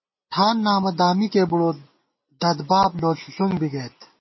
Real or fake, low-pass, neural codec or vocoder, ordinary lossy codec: real; 7.2 kHz; none; MP3, 24 kbps